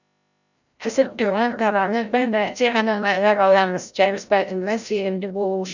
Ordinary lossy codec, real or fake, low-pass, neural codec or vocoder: none; fake; 7.2 kHz; codec, 16 kHz, 0.5 kbps, FreqCodec, larger model